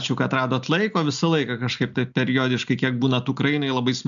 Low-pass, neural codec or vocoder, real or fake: 7.2 kHz; none; real